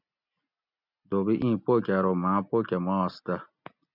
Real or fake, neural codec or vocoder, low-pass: real; none; 5.4 kHz